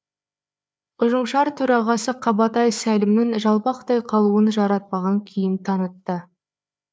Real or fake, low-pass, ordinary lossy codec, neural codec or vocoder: fake; none; none; codec, 16 kHz, 4 kbps, FreqCodec, larger model